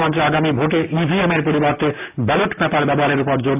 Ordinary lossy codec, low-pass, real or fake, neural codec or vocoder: AAC, 32 kbps; 3.6 kHz; real; none